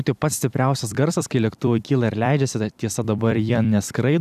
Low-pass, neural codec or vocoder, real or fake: 14.4 kHz; vocoder, 44.1 kHz, 128 mel bands every 256 samples, BigVGAN v2; fake